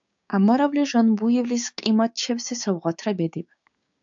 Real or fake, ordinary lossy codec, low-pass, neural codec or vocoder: fake; AAC, 64 kbps; 7.2 kHz; codec, 16 kHz, 6 kbps, DAC